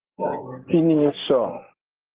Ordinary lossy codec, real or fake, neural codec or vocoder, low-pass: Opus, 16 kbps; real; none; 3.6 kHz